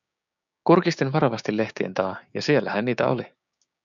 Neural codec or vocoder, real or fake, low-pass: codec, 16 kHz, 6 kbps, DAC; fake; 7.2 kHz